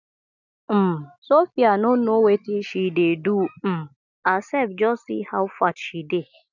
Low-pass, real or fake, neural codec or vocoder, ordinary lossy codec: 7.2 kHz; real; none; none